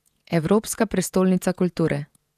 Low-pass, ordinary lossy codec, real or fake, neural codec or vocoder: 14.4 kHz; none; fake; vocoder, 48 kHz, 128 mel bands, Vocos